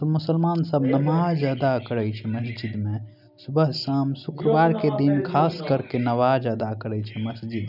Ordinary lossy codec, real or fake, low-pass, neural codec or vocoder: none; real; 5.4 kHz; none